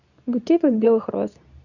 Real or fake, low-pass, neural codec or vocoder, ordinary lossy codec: fake; 7.2 kHz; codec, 24 kHz, 0.9 kbps, WavTokenizer, medium speech release version 2; none